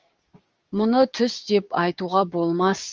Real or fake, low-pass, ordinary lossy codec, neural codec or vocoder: fake; 7.2 kHz; Opus, 24 kbps; codec, 16 kHz, 6 kbps, DAC